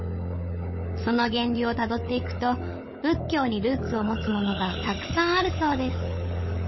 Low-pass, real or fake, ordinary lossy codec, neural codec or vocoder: 7.2 kHz; fake; MP3, 24 kbps; codec, 16 kHz, 16 kbps, FunCodec, trained on LibriTTS, 50 frames a second